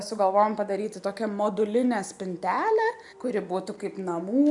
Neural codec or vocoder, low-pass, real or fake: none; 10.8 kHz; real